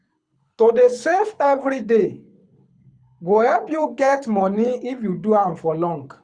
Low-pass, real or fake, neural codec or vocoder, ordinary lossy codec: 9.9 kHz; fake; codec, 24 kHz, 6 kbps, HILCodec; Opus, 64 kbps